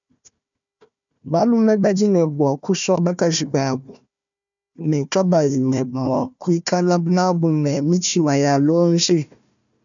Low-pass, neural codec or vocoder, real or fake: 7.2 kHz; codec, 16 kHz, 1 kbps, FunCodec, trained on Chinese and English, 50 frames a second; fake